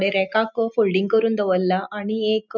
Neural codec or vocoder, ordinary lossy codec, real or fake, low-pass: none; none; real; 7.2 kHz